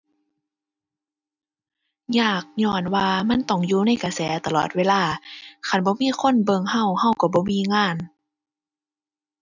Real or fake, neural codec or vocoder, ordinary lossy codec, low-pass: real; none; none; 7.2 kHz